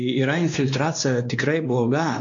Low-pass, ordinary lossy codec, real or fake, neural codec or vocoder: 7.2 kHz; AAC, 64 kbps; fake; codec, 16 kHz, 2 kbps, X-Codec, WavLM features, trained on Multilingual LibriSpeech